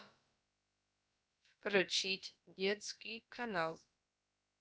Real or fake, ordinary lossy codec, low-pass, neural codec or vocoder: fake; none; none; codec, 16 kHz, about 1 kbps, DyCAST, with the encoder's durations